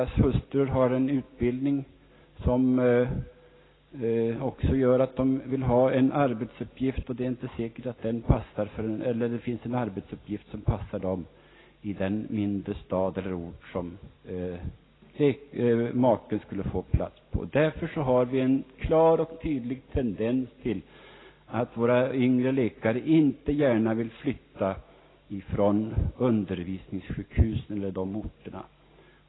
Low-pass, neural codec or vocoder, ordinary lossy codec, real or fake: 7.2 kHz; none; AAC, 16 kbps; real